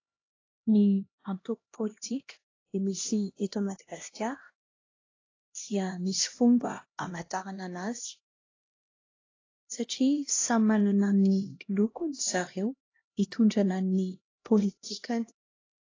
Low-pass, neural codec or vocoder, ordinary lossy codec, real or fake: 7.2 kHz; codec, 16 kHz, 1 kbps, X-Codec, HuBERT features, trained on LibriSpeech; AAC, 32 kbps; fake